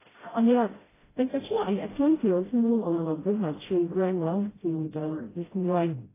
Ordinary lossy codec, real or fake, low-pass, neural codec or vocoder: AAC, 16 kbps; fake; 3.6 kHz; codec, 16 kHz, 0.5 kbps, FreqCodec, smaller model